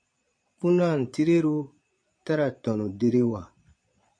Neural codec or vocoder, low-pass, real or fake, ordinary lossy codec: vocoder, 22.05 kHz, 80 mel bands, Vocos; 9.9 kHz; fake; MP3, 48 kbps